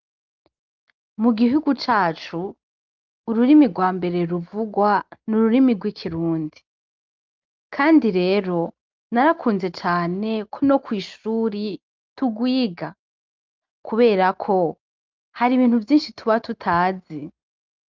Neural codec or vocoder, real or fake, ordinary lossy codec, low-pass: none; real; Opus, 24 kbps; 7.2 kHz